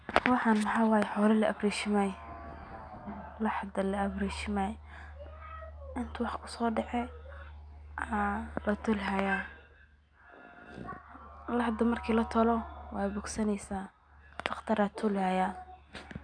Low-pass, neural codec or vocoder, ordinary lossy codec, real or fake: 9.9 kHz; none; none; real